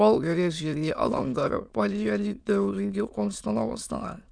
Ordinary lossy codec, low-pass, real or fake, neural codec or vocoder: none; 9.9 kHz; fake; autoencoder, 22.05 kHz, a latent of 192 numbers a frame, VITS, trained on many speakers